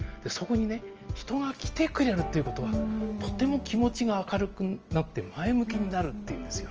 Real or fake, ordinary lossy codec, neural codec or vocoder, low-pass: real; Opus, 24 kbps; none; 7.2 kHz